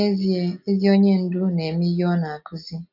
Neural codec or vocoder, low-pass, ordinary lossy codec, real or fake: none; 5.4 kHz; none; real